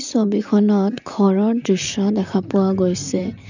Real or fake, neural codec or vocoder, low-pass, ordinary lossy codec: fake; vocoder, 44.1 kHz, 128 mel bands, Pupu-Vocoder; 7.2 kHz; none